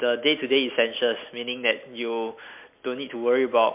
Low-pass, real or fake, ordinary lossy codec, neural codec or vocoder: 3.6 kHz; real; MP3, 32 kbps; none